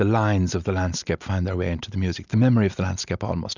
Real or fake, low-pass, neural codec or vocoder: real; 7.2 kHz; none